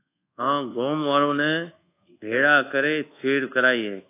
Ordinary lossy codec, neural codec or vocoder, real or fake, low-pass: AAC, 24 kbps; codec, 24 kHz, 1.2 kbps, DualCodec; fake; 3.6 kHz